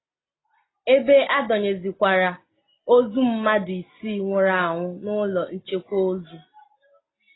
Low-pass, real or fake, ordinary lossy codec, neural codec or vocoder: 7.2 kHz; real; AAC, 16 kbps; none